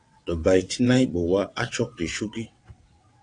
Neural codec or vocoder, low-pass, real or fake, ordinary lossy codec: vocoder, 22.05 kHz, 80 mel bands, WaveNeXt; 9.9 kHz; fake; AAC, 48 kbps